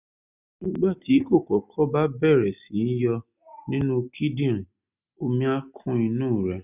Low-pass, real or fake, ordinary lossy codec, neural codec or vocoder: 3.6 kHz; real; none; none